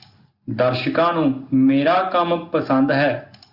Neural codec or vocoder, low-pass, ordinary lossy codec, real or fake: none; 5.4 kHz; Opus, 64 kbps; real